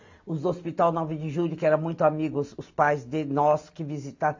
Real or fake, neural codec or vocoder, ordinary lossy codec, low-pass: real; none; AAC, 48 kbps; 7.2 kHz